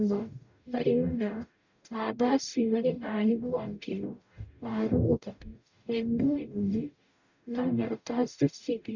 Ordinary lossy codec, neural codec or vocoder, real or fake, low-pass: none; codec, 44.1 kHz, 0.9 kbps, DAC; fake; 7.2 kHz